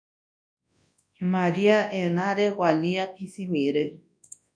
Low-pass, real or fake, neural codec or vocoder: 9.9 kHz; fake; codec, 24 kHz, 0.9 kbps, WavTokenizer, large speech release